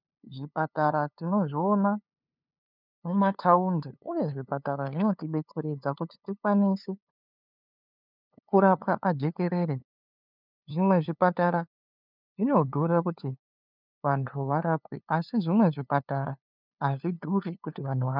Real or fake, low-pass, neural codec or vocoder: fake; 5.4 kHz; codec, 16 kHz, 2 kbps, FunCodec, trained on LibriTTS, 25 frames a second